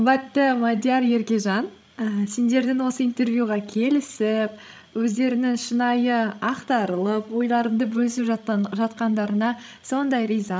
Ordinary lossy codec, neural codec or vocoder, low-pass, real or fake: none; codec, 16 kHz, 16 kbps, FreqCodec, larger model; none; fake